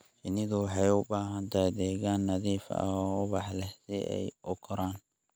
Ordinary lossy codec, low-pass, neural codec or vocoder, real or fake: none; none; none; real